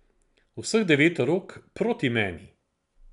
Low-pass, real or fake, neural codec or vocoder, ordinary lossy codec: 10.8 kHz; real; none; none